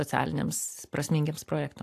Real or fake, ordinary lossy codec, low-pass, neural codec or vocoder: real; AAC, 64 kbps; 14.4 kHz; none